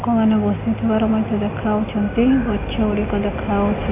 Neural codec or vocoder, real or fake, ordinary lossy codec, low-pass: none; real; AAC, 24 kbps; 3.6 kHz